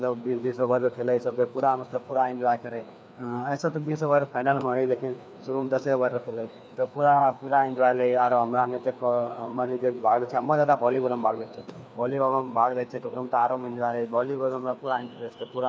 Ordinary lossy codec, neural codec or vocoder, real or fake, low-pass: none; codec, 16 kHz, 2 kbps, FreqCodec, larger model; fake; none